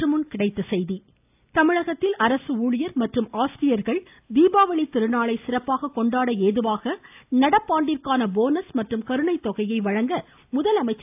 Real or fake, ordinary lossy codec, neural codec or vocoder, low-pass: real; AAC, 32 kbps; none; 3.6 kHz